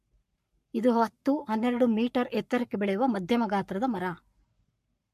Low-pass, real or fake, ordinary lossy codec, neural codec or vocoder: 14.4 kHz; fake; MP3, 64 kbps; codec, 44.1 kHz, 7.8 kbps, Pupu-Codec